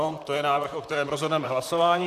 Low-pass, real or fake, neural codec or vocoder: 14.4 kHz; fake; vocoder, 44.1 kHz, 128 mel bands, Pupu-Vocoder